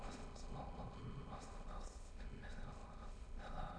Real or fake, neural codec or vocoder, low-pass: fake; autoencoder, 22.05 kHz, a latent of 192 numbers a frame, VITS, trained on many speakers; 9.9 kHz